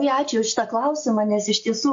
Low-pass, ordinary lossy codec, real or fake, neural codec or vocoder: 7.2 kHz; MP3, 48 kbps; real; none